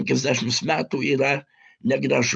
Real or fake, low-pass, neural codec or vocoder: real; 9.9 kHz; none